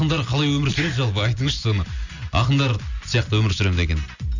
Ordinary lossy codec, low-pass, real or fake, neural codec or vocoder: none; 7.2 kHz; real; none